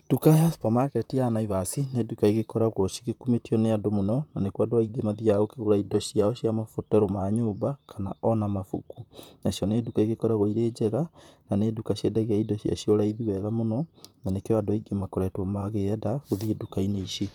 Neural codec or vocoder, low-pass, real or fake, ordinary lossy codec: vocoder, 44.1 kHz, 128 mel bands, Pupu-Vocoder; 19.8 kHz; fake; none